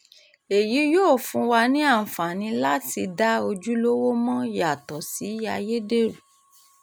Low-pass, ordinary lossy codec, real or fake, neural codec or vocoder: none; none; real; none